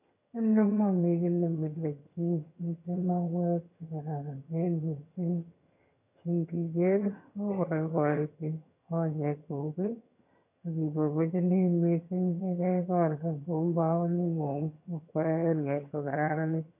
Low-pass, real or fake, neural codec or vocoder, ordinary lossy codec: 3.6 kHz; fake; vocoder, 22.05 kHz, 80 mel bands, HiFi-GAN; none